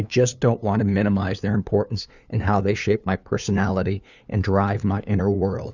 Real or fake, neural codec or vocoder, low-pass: fake; codec, 16 kHz, 2 kbps, FunCodec, trained on LibriTTS, 25 frames a second; 7.2 kHz